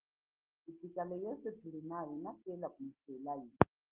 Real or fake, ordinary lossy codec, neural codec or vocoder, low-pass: real; Opus, 16 kbps; none; 3.6 kHz